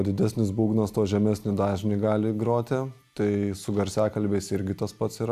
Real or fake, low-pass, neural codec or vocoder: real; 14.4 kHz; none